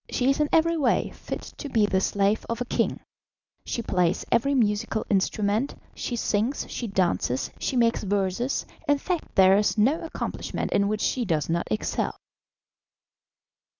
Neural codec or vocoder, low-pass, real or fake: none; 7.2 kHz; real